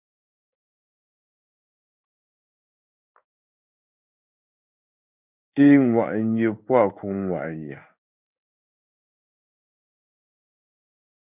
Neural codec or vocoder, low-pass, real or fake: codec, 16 kHz in and 24 kHz out, 1 kbps, XY-Tokenizer; 3.6 kHz; fake